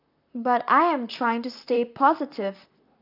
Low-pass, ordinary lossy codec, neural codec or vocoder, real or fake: 5.4 kHz; none; vocoder, 44.1 kHz, 128 mel bands, Pupu-Vocoder; fake